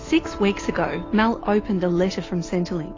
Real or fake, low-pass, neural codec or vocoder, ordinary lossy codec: real; 7.2 kHz; none; AAC, 32 kbps